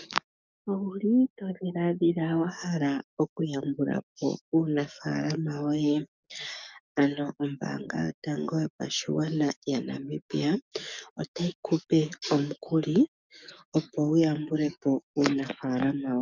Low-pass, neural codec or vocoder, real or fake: 7.2 kHz; codec, 44.1 kHz, 7.8 kbps, DAC; fake